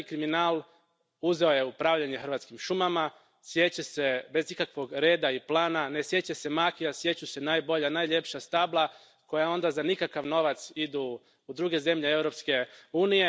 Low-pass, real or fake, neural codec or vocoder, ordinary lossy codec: none; real; none; none